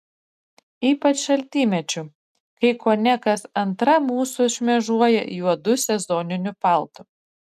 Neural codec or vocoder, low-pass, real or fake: none; 14.4 kHz; real